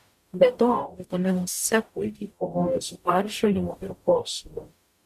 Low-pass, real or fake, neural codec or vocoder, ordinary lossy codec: 14.4 kHz; fake; codec, 44.1 kHz, 0.9 kbps, DAC; MP3, 64 kbps